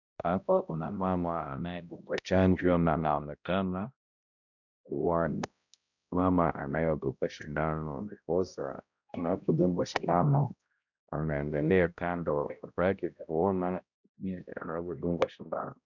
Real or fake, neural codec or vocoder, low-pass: fake; codec, 16 kHz, 0.5 kbps, X-Codec, HuBERT features, trained on balanced general audio; 7.2 kHz